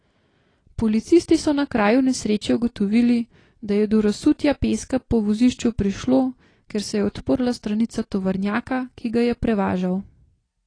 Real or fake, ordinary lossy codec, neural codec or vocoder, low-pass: real; AAC, 32 kbps; none; 9.9 kHz